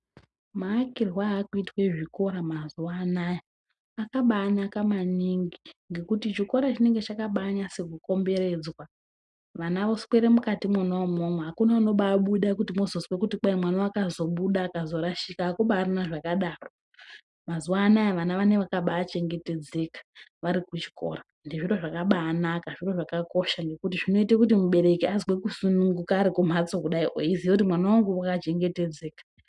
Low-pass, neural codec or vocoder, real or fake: 10.8 kHz; none; real